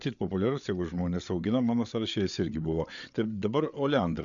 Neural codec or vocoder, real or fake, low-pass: codec, 16 kHz, 8 kbps, FreqCodec, larger model; fake; 7.2 kHz